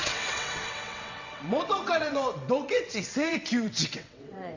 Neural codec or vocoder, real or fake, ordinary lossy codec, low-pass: vocoder, 22.05 kHz, 80 mel bands, WaveNeXt; fake; Opus, 64 kbps; 7.2 kHz